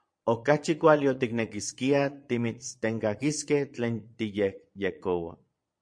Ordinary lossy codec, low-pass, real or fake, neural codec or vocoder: MP3, 48 kbps; 9.9 kHz; fake; vocoder, 22.05 kHz, 80 mel bands, Vocos